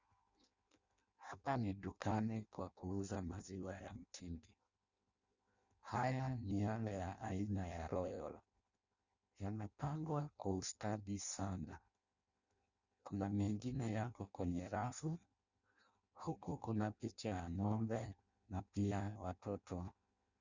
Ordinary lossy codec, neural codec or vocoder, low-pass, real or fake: Opus, 64 kbps; codec, 16 kHz in and 24 kHz out, 0.6 kbps, FireRedTTS-2 codec; 7.2 kHz; fake